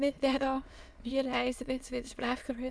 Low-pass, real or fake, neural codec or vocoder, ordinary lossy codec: none; fake; autoencoder, 22.05 kHz, a latent of 192 numbers a frame, VITS, trained on many speakers; none